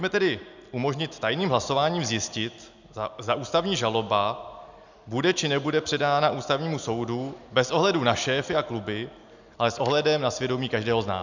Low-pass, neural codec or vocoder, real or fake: 7.2 kHz; none; real